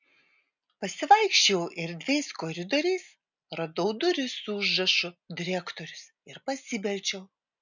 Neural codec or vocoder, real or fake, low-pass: none; real; 7.2 kHz